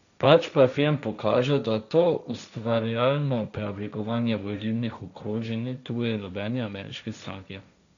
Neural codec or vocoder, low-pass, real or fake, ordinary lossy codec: codec, 16 kHz, 1.1 kbps, Voila-Tokenizer; 7.2 kHz; fake; none